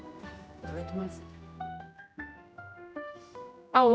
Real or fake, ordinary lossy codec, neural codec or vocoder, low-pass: fake; none; codec, 16 kHz, 1 kbps, X-Codec, HuBERT features, trained on general audio; none